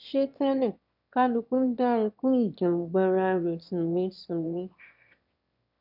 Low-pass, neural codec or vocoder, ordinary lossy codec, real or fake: 5.4 kHz; autoencoder, 22.05 kHz, a latent of 192 numbers a frame, VITS, trained on one speaker; none; fake